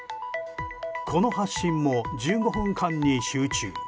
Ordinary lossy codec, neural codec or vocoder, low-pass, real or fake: none; none; none; real